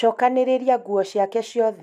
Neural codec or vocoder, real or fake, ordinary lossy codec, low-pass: none; real; none; 14.4 kHz